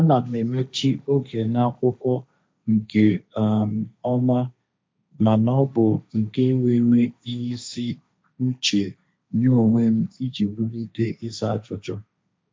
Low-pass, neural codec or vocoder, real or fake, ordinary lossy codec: none; codec, 16 kHz, 1.1 kbps, Voila-Tokenizer; fake; none